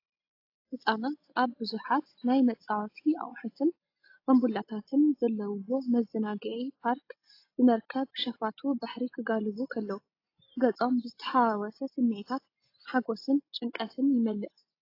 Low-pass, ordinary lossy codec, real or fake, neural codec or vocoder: 5.4 kHz; AAC, 32 kbps; real; none